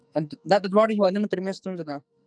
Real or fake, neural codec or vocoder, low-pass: fake; codec, 44.1 kHz, 2.6 kbps, SNAC; 9.9 kHz